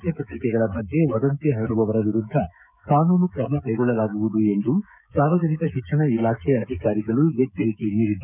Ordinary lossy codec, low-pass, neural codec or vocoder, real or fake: none; 3.6 kHz; codec, 16 kHz, 16 kbps, FreqCodec, smaller model; fake